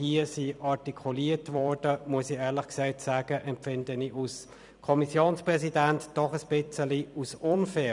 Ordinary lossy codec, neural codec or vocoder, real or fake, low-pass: none; vocoder, 44.1 kHz, 128 mel bands every 256 samples, BigVGAN v2; fake; 10.8 kHz